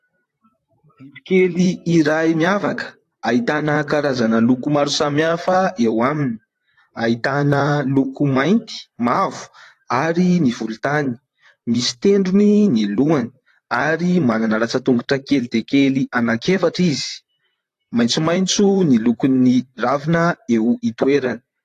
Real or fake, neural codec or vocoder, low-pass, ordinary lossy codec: fake; vocoder, 44.1 kHz, 128 mel bands, Pupu-Vocoder; 14.4 kHz; AAC, 48 kbps